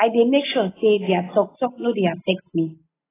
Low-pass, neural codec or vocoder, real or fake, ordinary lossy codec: 3.6 kHz; none; real; AAC, 16 kbps